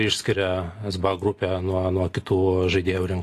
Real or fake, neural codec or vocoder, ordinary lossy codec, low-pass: real; none; AAC, 48 kbps; 14.4 kHz